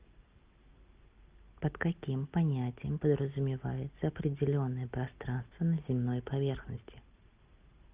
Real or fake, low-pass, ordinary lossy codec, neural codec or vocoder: real; 3.6 kHz; Opus, 24 kbps; none